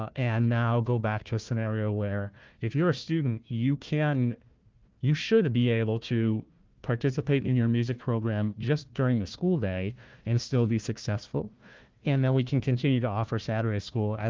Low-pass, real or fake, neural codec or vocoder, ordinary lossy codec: 7.2 kHz; fake; codec, 16 kHz, 1 kbps, FunCodec, trained on Chinese and English, 50 frames a second; Opus, 32 kbps